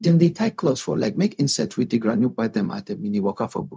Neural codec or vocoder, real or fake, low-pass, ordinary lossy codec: codec, 16 kHz, 0.4 kbps, LongCat-Audio-Codec; fake; none; none